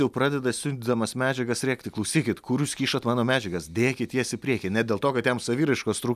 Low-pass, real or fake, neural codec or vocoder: 14.4 kHz; real; none